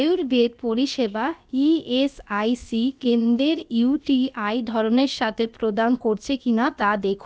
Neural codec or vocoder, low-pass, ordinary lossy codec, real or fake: codec, 16 kHz, about 1 kbps, DyCAST, with the encoder's durations; none; none; fake